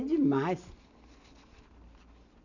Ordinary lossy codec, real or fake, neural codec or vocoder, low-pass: none; real; none; 7.2 kHz